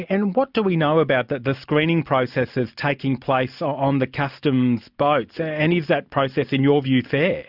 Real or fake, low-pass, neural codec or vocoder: real; 5.4 kHz; none